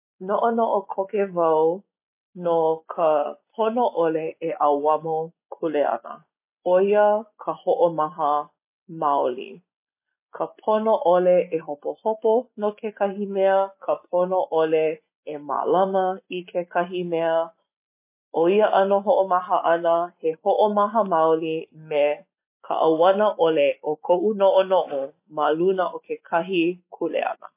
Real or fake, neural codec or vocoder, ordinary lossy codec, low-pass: real; none; MP3, 24 kbps; 3.6 kHz